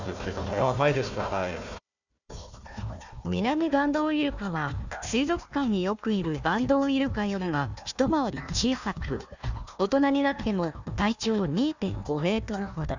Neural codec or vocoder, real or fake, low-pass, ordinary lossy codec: codec, 16 kHz, 1 kbps, FunCodec, trained on Chinese and English, 50 frames a second; fake; 7.2 kHz; none